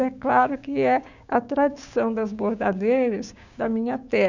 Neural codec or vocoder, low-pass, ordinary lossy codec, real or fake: codec, 16 kHz, 6 kbps, DAC; 7.2 kHz; none; fake